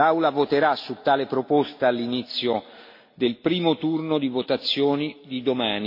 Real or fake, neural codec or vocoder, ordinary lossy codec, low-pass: real; none; MP3, 32 kbps; 5.4 kHz